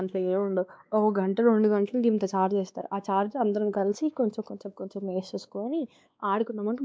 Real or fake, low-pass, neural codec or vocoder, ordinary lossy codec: fake; none; codec, 16 kHz, 2 kbps, X-Codec, WavLM features, trained on Multilingual LibriSpeech; none